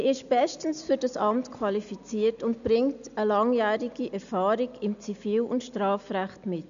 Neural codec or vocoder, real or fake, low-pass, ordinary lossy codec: none; real; 7.2 kHz; MP3, 96 kbps